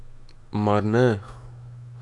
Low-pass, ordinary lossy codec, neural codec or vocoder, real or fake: 10.8 kHz; none; autoencoder, 48 kHz, 128 numbers a frame, DAC-VAE, trained on Japanese speech; fake